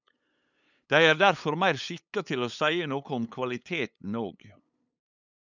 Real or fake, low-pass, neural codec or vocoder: fake; 7.2 kHz; codec, 16 kHz, 8 kbps, FunCodec, trained on LibriTTS, 25 frames a second